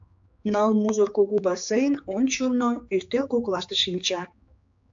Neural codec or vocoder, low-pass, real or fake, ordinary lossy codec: codec, 16 kHz, 4 kbps, X-Codec, HuBERT features, trained on general audio; 7.2 kHz; fake; AAC, 64 kbps